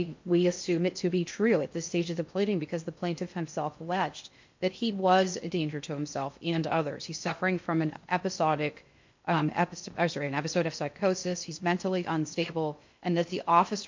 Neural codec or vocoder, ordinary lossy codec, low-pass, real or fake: codec, 16 kHz in and 24 kHz out, 0.6 kbps, FocalCodec, streaming, 2048 codes; MP3, 48 kbps; 7.2 kHz; fake